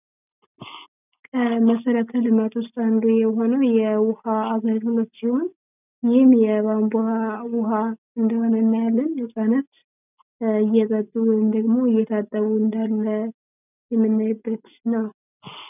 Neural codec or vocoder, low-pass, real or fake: none; 3.6 kHz; real